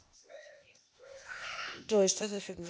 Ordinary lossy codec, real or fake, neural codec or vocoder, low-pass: none; fake; codec, 16 kHz, 0.8 kbps, ZipCodec; none